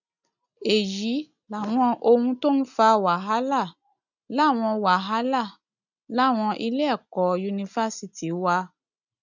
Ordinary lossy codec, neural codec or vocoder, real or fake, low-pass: none; none; real; 7.2 kHz